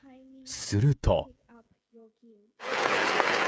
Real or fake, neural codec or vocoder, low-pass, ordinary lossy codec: fake; codec, 16 kHz, 16 kbps, FreqCodec, smaller model; none; none